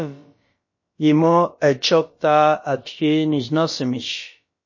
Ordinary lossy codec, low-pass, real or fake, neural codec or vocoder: MP3, 32 kbps; 7.2 kHz; fake; codec, 16 kHz, about 1 kbps, DyCAST, with the encoder's durations